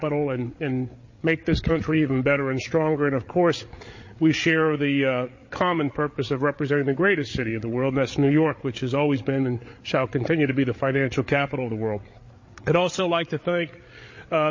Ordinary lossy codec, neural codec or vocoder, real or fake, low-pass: MP3, 32 kbps; codec, 16 kHz, 8 kbps, FreqCodec, larger model; fake; 7.2 kHz